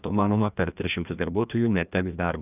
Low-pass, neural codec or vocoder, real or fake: 3.6 kHz; codec, 16 kHz, 1 kbps, FreqCodec, larger model; fake